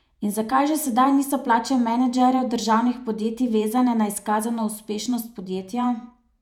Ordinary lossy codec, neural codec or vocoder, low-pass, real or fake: none; vocoder, 48 kHz, 128 mel bands, Vocos; 19.8 kHz; fake